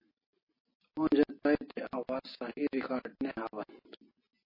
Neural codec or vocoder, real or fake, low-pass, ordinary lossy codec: none; real; 5.4 kHz; MP3, 24 kbps